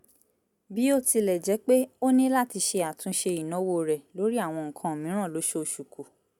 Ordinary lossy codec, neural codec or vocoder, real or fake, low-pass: none; none; real; 19.8 kHz